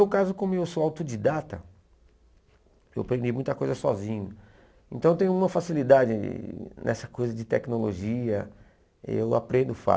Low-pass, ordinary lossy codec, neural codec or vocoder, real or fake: none; none; none; real